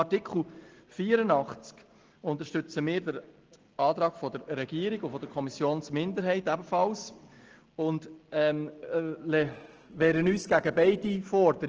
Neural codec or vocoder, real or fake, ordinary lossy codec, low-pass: none; real; Opus, 16 kbps; 7.2 kHz